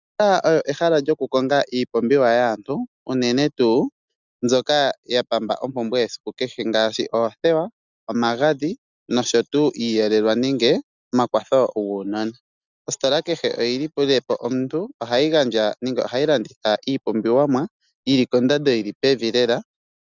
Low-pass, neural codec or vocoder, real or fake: 7.2 kHz; none; real